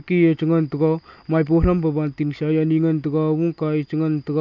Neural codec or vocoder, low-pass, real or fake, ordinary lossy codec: none; 7.2 kHz; real; none